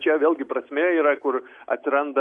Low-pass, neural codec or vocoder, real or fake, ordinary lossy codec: 10.8 kHz; none; real; MP3, 64 kbps